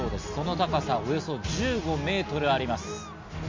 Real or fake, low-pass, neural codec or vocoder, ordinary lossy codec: real; 7.2 kHz; none; MP3, 48 kbps